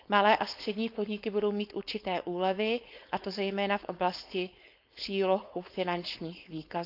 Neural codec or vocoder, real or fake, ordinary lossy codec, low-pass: codec, 16 kHz, 4.8 kbps, FACodec; fake; none; 5.4 kHz